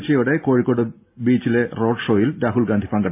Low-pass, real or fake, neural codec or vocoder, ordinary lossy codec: 3.6 kHz; real; none; MP3, 24 kbps